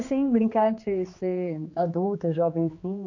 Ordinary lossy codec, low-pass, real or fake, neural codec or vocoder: AAC, 48 kbps; 7.2 kHz; fake; codec, 16 kHz, 2 kbps, X-Codec, HuBERT features, trained on general audio